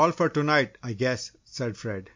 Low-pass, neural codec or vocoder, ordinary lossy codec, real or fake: 7.2 kHz; none; MP3, 64 kbps; real